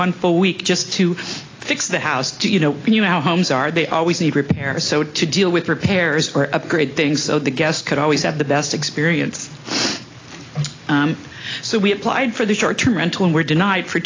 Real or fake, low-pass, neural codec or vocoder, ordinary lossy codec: real; 7.2 kHz; none; AAC, 32 kbps